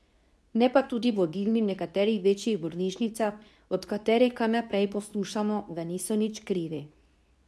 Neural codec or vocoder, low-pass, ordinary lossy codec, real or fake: codec, 24 kHz, 0.9 kbps, WavTokenizer, medium speech release version 2; none; none; fake